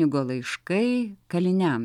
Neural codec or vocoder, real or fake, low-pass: none; real; 19.8 kHz